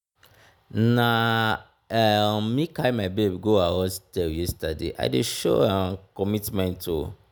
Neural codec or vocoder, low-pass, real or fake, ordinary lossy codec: none; none; real; none